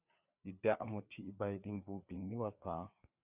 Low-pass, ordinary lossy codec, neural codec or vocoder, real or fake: 3.6 kHz; Opus, 64 kbps; codec, 16 kHz, 4 kbps, FreqCodec, larger model; fake